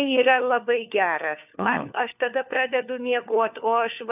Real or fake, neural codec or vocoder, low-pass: fake; codec, 16 kHz, 4 kbps, FunCodec, trained on LibriTTS, 50 frames a second; 3.6 kHz